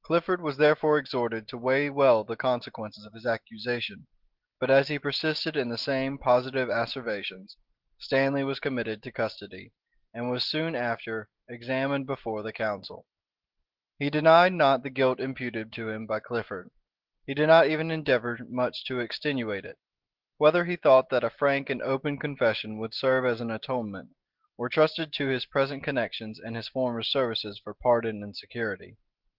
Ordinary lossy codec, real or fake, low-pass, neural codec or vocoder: Opus, 16 kbps; real; 5.4 kHz; none